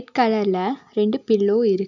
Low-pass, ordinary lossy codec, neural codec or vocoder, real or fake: 7.2 kHz; none; none; real